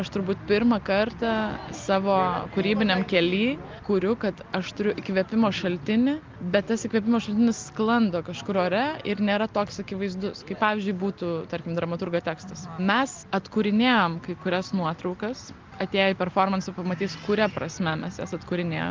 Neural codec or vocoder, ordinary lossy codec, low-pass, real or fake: none; Opus, 32 kbps; 7.2 kHz; real